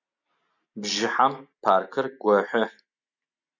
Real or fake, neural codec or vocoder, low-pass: real; none; 7.2 kHz